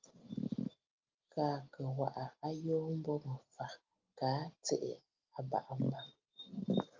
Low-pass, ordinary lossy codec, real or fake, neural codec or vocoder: 7.2 kHz; Opus, 32 kbps; real; none